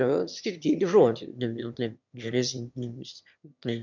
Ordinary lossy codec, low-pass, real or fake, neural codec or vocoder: MP3, 64 kbps; 7.2 kHz; fake; autoencoder, 22.05 kHz, a latent of 192 numbers a frame, VITS, trained on one speaker